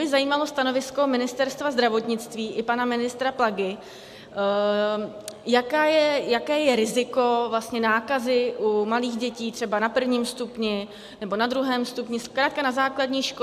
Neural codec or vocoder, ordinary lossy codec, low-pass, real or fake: none; AAC, 96 kbps; 14.4 kHz; real